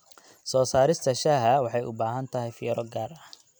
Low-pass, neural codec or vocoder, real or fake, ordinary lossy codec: none; none; real; none